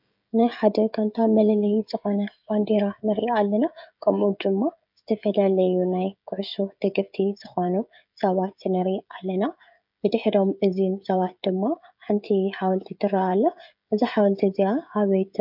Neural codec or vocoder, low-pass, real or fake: codec, 16 kHz, 16 kbps, FreqCodec, smaller model; 5.4 kHz; fake